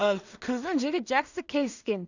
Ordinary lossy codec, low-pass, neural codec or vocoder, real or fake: none; 7.2 kHz; codec, 16 kHz in and 24 kHz out, 0.4 kbps, LongCat-Audio-Codec, two codebook decoder; fake